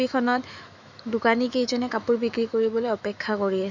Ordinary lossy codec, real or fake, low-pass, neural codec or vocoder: none; fake; 7.2 kHz; autoencoder, 48 kHz, 128 numbers a frame, DAC-VAE, trained on Japanese speech